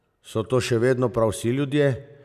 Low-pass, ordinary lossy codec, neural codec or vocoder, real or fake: 14.4 kHz; none; none; real